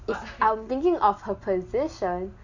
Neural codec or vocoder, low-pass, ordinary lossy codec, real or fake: none; 7.2 kHz; none; real